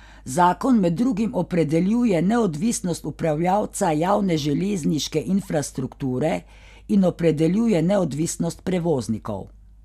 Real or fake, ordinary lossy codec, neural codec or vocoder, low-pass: real; none; none; 14.4 kHz